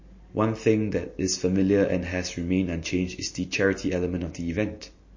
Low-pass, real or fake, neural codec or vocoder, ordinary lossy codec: 7.2 kHz; real; none; MP3, 32 kbps